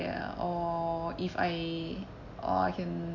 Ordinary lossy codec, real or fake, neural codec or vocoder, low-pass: none; real; none; 7.2 kHz